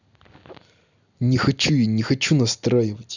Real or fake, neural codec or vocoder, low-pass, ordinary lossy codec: real; none; 7.2 kHz; none